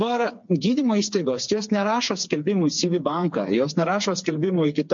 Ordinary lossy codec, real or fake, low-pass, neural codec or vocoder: MP3, 48 kbps; fake; 7.2 kHz; codec, 16 kHz, 4 kbps, FreqCodec, smaller model